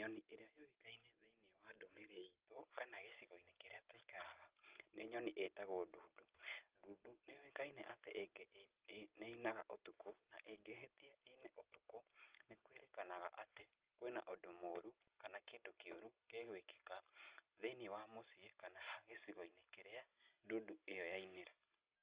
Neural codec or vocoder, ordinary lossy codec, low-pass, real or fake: none; Opus, 32 kbps; 3.6 kHz; real